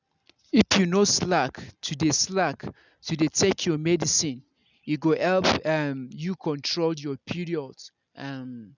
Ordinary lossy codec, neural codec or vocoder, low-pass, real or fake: none; none; 7.2 kHz; real